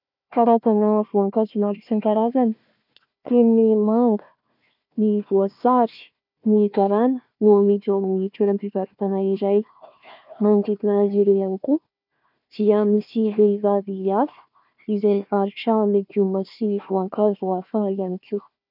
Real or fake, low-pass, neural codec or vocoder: fake; 5.4 kHz; codec, 16 kHz, 1 kbps, FunCodec, trained on Chinese and English, 50 frames a second